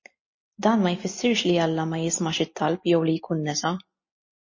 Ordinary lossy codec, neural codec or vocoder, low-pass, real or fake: MP3, 32 kbps; none; 7.2 kHz; real